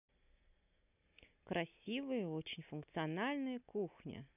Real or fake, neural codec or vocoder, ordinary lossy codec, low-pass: real; none; none; 3.6 kHz